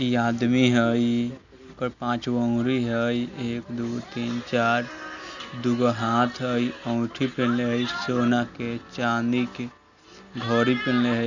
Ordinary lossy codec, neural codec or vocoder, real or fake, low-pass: none; none; real; 7.2 kHz